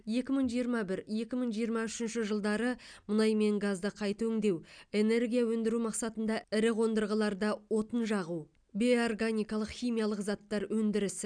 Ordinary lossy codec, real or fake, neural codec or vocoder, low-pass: none; real; none; 9.9 kHz